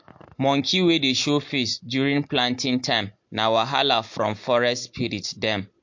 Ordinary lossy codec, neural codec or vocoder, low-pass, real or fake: MP3, 48 kbps; none; 7.2 kHz; real